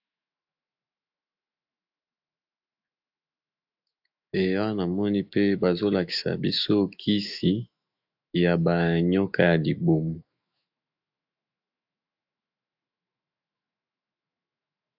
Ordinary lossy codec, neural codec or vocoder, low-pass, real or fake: AAC, 48 kbps; autoencoder, 48 kHz, 128 numbers a frame, DAC-VAE, trained on Japanese speech; 5.4 kHz; fake